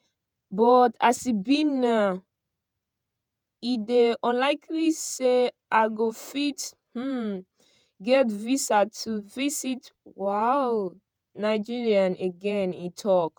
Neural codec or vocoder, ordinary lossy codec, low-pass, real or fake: vocoder, 48 kHz, 128 mel bands, Vocos; none; none; fake